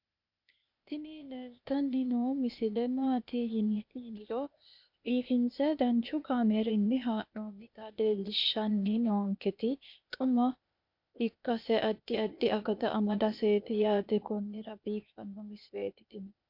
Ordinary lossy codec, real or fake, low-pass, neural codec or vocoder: MP3, 48 kbps; fake; 5.4 kHz; codec, 16 kHz, 0.8 kbps, ZipCodec